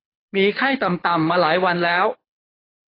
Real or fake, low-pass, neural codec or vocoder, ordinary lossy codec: fake; 5.4 kHz; codec, 24 kHz, 6 kbps, HILCodec; AAC, 24 kbps